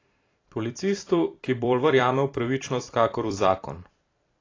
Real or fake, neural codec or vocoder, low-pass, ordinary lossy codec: fake; vocoder, 44.1 kHz, 128 mel bands every 256 samples, BigVGAN v2; 7.2 kHz; AAC, 32 kbps